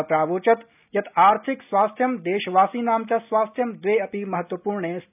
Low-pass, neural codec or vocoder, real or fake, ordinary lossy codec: 3.6 kHz; none; real; none